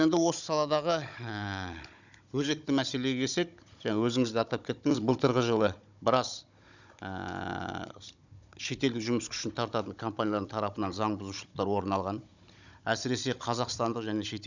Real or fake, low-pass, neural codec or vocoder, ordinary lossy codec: fake; 7.2 kHz; codec, 16 kHz, 16 kbps, FunCodec, trained on Chinese and English, 50 frames a second; none